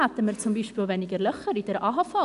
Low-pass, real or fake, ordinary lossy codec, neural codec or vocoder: 10.8 kHz; real; none; none